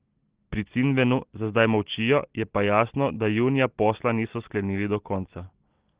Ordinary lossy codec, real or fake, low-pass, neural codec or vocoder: Opus, 16 kbps; real; 3.6 kHz; none